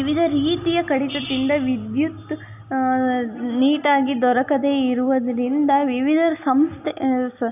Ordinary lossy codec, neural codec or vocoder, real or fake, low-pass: none; none; real; 3.6 kHz